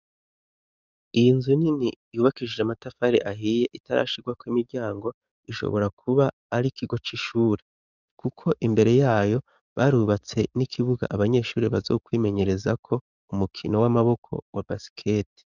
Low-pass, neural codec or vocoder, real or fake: 7.2 kHz; codec, 44.1 kHz, 7.8 kbps, DAC; fake